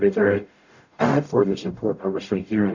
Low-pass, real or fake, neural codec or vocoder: 7.2 kHz; fake; codec, 44.1 kHz, 0.9 kbps, DAC